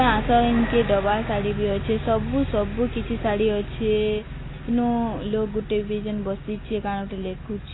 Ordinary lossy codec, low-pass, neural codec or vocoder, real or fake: AAC, 16 kbps; 7.2 kHz; none; real